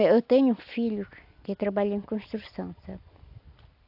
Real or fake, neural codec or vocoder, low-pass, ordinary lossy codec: real; none; 5.4 kHz; AAC, 48 kbps